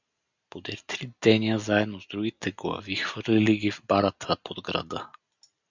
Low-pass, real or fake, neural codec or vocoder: 7.2 kHz; real; none